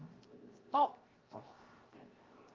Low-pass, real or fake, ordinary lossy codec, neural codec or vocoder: 7.2 kHz; fake; Opus, 32 kbps; codec, 16 kHz, 0.7 kbps, FocalCodec